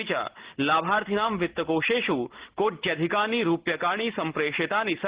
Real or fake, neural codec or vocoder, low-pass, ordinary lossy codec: real; none; 3.6 kHz; Opus, 16 kbps